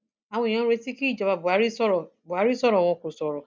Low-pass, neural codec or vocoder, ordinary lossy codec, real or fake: none; none; none; real